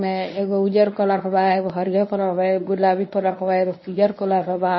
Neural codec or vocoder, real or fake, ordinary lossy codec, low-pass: codec, 24 kHz, 0.9 kbps, WavTokenizer, medium speech release version 1; fake; MP3, 24 kbps; 7.2 kHz